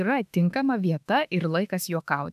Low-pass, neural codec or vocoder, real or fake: 14.4 kHz; autoencoder, 48 kHz, 32 numbers a frame, DAC-VAE, trained on Japanese speech; fake